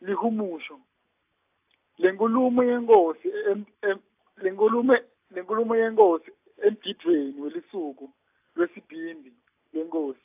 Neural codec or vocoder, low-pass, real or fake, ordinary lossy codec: none; 3.6 kHz; real; AAC, 32 kbps